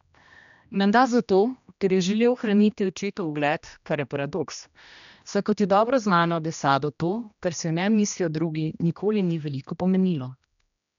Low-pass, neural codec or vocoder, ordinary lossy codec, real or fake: 7.2 kHz; codec, 16 kHz, 1 kbps, X-Codec, HuBERT features, trained on general audio; none; fake